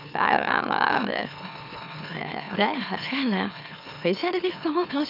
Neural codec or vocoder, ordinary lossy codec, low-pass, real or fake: autoencoder, 44.1 kHz, a latent of 192 numbers a frame, MeloTTS; none; 5.4 kHz; fake